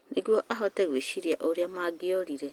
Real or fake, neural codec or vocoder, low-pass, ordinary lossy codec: real; none; 19.8 kHz; Opus, 24 kbps